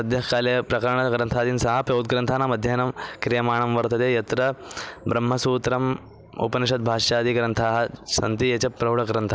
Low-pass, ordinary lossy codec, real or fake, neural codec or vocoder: none; none; real; none